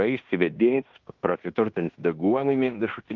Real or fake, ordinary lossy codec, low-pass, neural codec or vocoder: fake; Opus, 32 kbps; 7.2 kHz; codec, 16 kHz in and 24 kHz out, 0.9 kbps, LongCat-Audio-Codec, fine tuned four codebook decoder